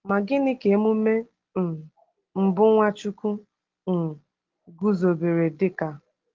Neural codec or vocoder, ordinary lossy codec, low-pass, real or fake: none; Opus, 16 kbps; 7.2 kHz; real